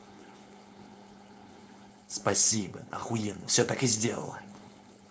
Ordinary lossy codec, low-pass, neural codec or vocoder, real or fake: none; none; codec, 16 kHz, 4.8 kbps, FACodec; fake